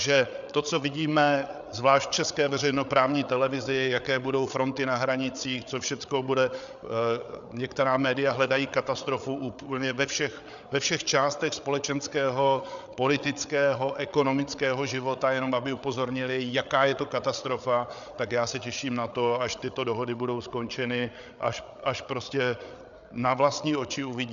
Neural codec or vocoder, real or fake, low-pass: codec, 16 kHz, 8 kbps, FreqCodec, larger model; fake; 7.2 kHz